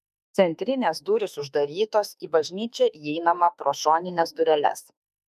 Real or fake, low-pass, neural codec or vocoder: fake; 14.4 kHz; autoencoder, 48 kHz, 32 numbers a frame, DAC-VAE, trained on Japanese speech